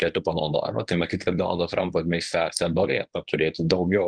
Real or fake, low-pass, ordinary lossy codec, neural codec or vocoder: fake; 9.9 kHz; Opus, 24 kbps; codec, 24 kHz, 0.9 kbps, WavTokenizer, medium speech release version 1